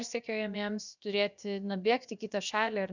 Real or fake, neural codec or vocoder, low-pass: fake; codec, 16 kHz, about 1 kbps, DyCAST, with the encoder's durations; 7.2 kHz